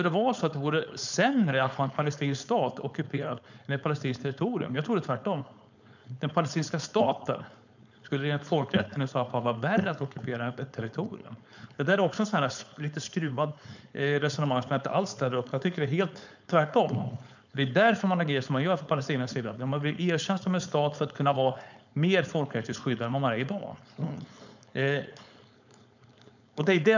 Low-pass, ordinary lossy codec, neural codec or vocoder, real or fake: 7.2 kHz; none; codec, 16 kHz, 4.8 kbps, FACodec; fake